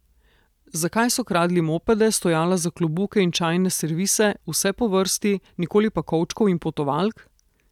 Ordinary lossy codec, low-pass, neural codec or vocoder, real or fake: none; 19.8 kHz; none; real